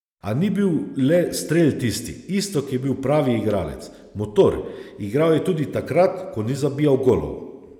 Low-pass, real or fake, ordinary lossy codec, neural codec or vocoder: 19.8 kHz; real; none; none